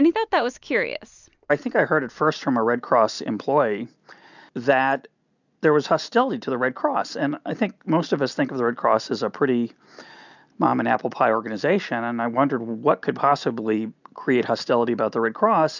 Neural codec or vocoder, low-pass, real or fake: none; 7.2 kHz; real